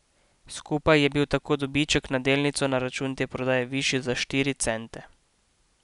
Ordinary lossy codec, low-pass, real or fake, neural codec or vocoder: none; 10.8 kHz; real; none